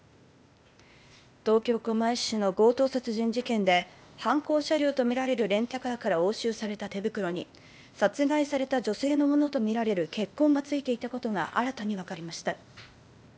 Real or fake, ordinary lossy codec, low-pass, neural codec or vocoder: fake; none; none; codec, 16 kHz, 0.8 kbps, ZipCodec